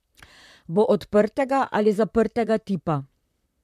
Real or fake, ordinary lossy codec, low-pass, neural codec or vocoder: fake; MP3, 96 kbps; 14.4 kHz; vocoder, 44.1 kHz, 128 mel bands, Pupu-Vocoder